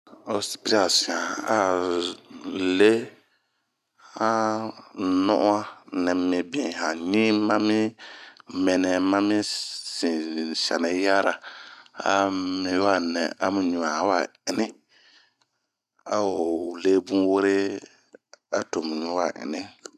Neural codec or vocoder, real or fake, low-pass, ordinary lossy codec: none; real; 14.4 kHz; none